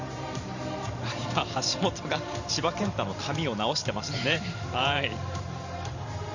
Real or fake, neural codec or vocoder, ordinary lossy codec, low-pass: fake; vocoder, 44.1 kHz, 128 mel bands every 512 samples, BigVGAN v2; none; 7.2 kHz